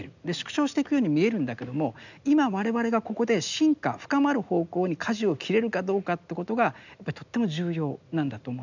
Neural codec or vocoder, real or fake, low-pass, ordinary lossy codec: none; real; 7.2 kHz; none